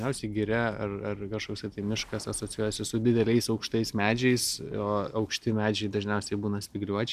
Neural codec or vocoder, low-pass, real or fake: none; 14.4 kHz; real